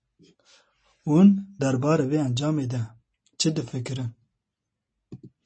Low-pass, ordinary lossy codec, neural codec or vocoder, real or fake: 9.9 kHz; MP3, 32 kbps; none; real